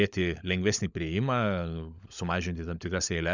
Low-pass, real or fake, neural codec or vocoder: 7.2 kHz; fake; codec, 16 kHz, 16 kbps, FunCodec, trained on LibriTTS, 50 frames a second